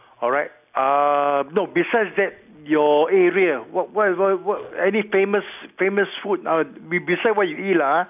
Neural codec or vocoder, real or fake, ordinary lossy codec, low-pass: none; real; none; 3.6 kHz